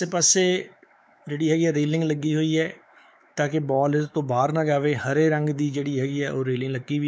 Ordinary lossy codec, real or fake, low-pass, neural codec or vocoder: none; fake; none; codec, 16 kHz, 4 kbps, X-Codec, WavLM features, trained on Multilingual LibriSpeech